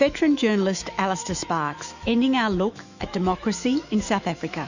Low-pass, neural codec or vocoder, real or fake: 7.2 kHz; autoencoder, 48 kHz, 128 numbers a frame, DAC-VAE, trained on Japanese speech; fake